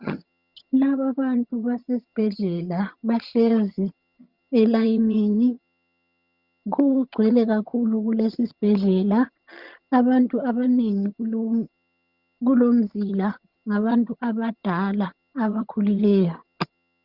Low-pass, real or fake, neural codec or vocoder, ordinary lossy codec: 5.4 kHz; fake; vocoder, 22.05 kHz, 80 mel bands, HiFi-GAN; Opus, 64 kbps